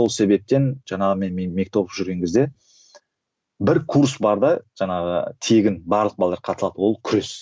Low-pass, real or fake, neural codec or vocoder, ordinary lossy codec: none; real; none; none